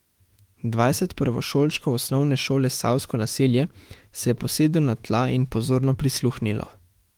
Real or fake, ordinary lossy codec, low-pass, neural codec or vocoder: fake; Opus, 24 kbps; 19.8 kHz; autoencoder, 48 kHz, 32 numbers a frame, DAC-VAE, trained on Japanese speech